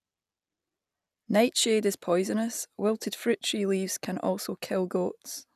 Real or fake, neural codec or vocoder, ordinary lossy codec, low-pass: real; none; none; 14.4 kHz